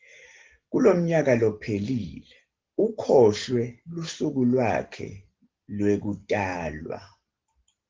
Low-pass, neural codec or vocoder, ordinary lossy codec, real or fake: 7.2 kHz; none; Opus, 16 kbps; real